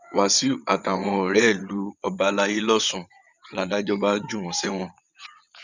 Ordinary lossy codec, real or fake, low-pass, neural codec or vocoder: none; fake; 7.2 kHz; vocoder, 22.05 kHz, 80 mel bands, WaveNeXt